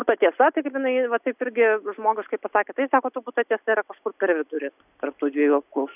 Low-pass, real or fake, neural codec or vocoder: 3.6 kHz; real; none